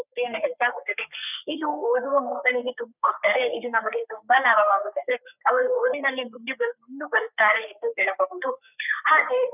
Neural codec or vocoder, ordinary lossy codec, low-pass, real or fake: codec, 44.1 kHz, 3.4 kbps, Pupu-Codec; none; 3.6 kHz; fake